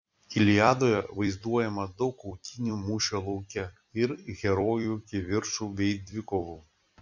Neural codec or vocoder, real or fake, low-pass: vocoder, 44.1 kHz, 128 mel bands every 256 samples, BigVGAN v2; fake; 7.2 kHz